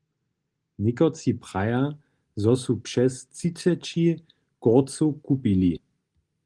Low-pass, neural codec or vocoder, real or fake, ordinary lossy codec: 10.8 kHz; none; real; Opus, 24 kbps